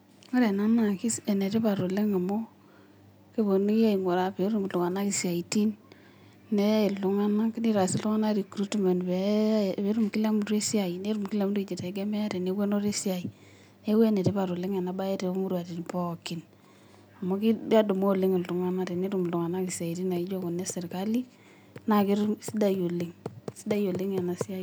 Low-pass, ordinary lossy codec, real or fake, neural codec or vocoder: none; none; real; none